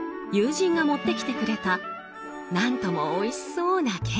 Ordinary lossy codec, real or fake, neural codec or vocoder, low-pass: none; real; none; none